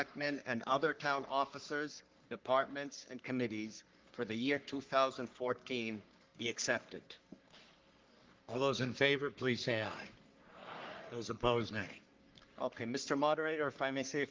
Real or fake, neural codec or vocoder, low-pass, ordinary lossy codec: fake; codec, 44.1 kHz, 3.4 kbps, Pupu-Codec; 7.2 kHz; Opus, 32 kbps